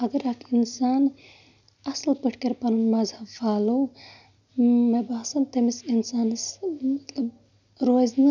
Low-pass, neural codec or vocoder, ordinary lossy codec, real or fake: 7.2 kHz; none; none; real